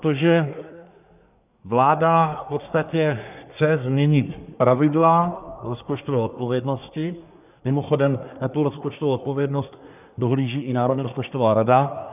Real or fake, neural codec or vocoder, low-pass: fake; codec, 24 kHz, 1 kbps, SNAC; 3.6 kHz